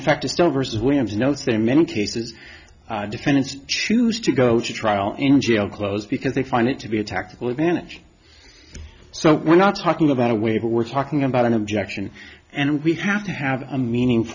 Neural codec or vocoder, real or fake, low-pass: none; real; 7.2 kHz